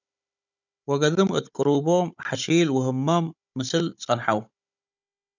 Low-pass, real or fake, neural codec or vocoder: 7.2 kHz; fake; codec, 16 kHz, 16 kbps, FunCodec, trained on Chinese and English, 50 frames a second